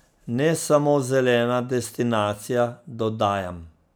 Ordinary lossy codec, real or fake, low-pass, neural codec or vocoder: none; real; none; none